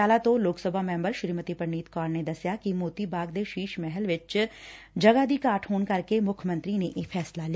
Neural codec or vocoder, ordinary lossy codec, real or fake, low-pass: none; none; real; none